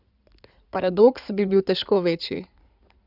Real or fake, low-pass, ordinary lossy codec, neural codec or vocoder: fake; 5.4 kHz; none; codec, 16 kHz in and 24 kHz out, 2.2 kbps, FireRedTTS-2 codec